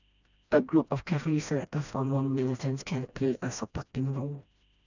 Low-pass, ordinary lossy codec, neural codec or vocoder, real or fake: 7.2 kHz; none; codec, 16 kHz, 1 kbps, FreqCodec, smaller model; fake